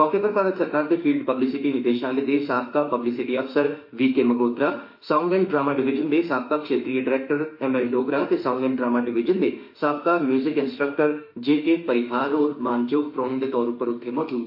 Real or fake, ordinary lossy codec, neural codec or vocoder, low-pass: fake; MP3, 32 kbps; autoencoder, 48 kHz, 32 numbers a frame, DAC-VAE, trained on Japanese speech; 5.4 kHz